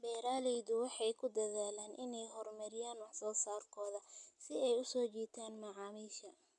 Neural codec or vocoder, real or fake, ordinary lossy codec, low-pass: none; real; none; 10.8 kHz